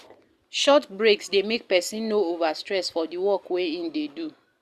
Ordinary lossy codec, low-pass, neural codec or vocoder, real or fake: Opus, 64 kbps; 14.4 kHz; none; real